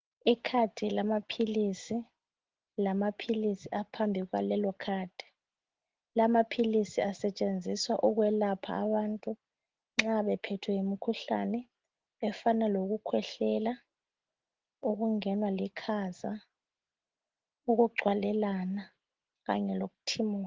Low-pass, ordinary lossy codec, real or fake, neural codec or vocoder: 7.2 kHz; Opus, 16 kbps; real; none